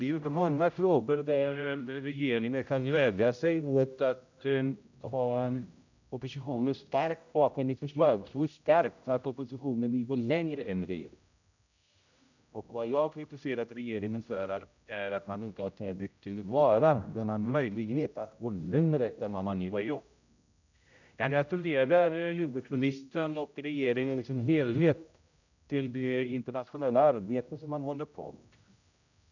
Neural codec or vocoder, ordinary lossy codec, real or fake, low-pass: codec, 16 kHz, 0.5 kbps, X-Codec, HuBERT features, trained on general audio; none; fake; 7.2 kHz